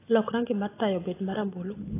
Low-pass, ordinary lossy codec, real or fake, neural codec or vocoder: 3.6 kHz; AAC, 16 kbps; real; none